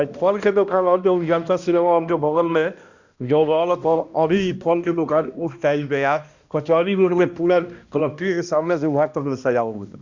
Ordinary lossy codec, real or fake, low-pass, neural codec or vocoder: Opus, 64 kbps; fake; 7.2 kHz; codec, 16 kHz, 1 kbps, X-Codec, HuBERT features, trained on balanced general audio